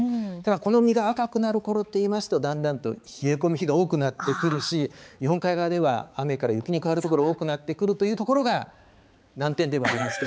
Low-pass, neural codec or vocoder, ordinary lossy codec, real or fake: none; codec, 16 kHz, 4 kbps, X-Codec, HuBERT features, trained on balanced general audio; none; fake